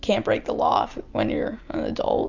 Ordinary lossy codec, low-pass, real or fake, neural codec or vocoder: Opus, 64 kbps; 7.2 kHz; real; none